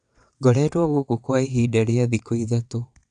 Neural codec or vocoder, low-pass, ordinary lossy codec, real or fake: vocoder, 22.05 kHz, 80 mel bands, WaveNeXt; 9.9 kHz; none; fake